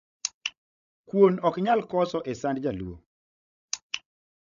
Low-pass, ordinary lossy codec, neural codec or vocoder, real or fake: 7.2 kHz; none; codec, 16 kHz, 16 kbps, FreqCodec, larger model; fake